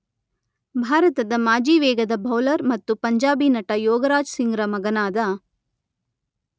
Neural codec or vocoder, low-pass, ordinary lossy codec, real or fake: none; none; none; real